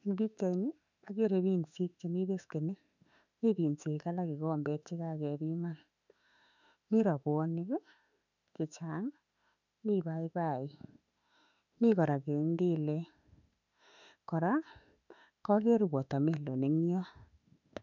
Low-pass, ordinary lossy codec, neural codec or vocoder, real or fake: 7.2 kHz; none; autoencoder, 48 kHz, 32 numbers a frame, DAC-VAE, trained on Japanese speech; fake